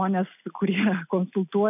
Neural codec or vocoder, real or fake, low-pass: none; real; 3.6 kHz